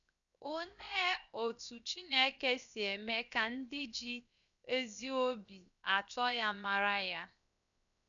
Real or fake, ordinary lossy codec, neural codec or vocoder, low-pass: fake; none; codec, 16 kHz, 0.7 kbps, FocalCodec; 7.2 kHz